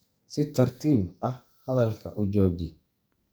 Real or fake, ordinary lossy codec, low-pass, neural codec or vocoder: fake; none; none; codec, 44.1 kHz, 2.6 kbps, SNAC